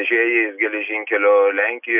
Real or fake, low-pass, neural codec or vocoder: real; 3.6 kHz; none